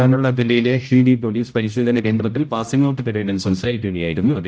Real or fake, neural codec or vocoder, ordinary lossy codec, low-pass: fake; codec, 16 kHz, 0.5 kbps, X-Codec, HuBERT features, trained on general audio; none; none